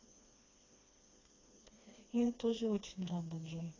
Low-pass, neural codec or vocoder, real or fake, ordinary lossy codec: 7.2 kHz; codec, 16 kHz, 2 kbps, FreqCodec, smaller model; fake; AAC, 32 kbps